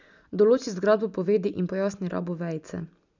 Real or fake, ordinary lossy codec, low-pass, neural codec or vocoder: real; none; 7.2 kHz; none